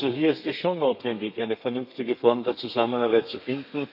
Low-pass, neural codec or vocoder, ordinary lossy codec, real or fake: 5.4 kHz; codec, 32 kHz, 1.9 kbps, SNAC; none; fake